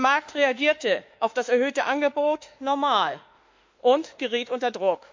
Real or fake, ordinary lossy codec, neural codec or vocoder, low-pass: fake; MP3, 64 kbps; autoencoder, 48 kHz, 32 numbers a frame, DAC-VAE, trained on Japanese speech; 7.2 kHz